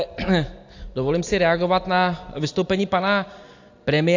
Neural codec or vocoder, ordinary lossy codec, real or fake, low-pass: none; AAC, 48 kbps; real; 7.2 kHz